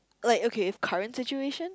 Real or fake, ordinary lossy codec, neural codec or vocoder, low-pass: real; none; none; none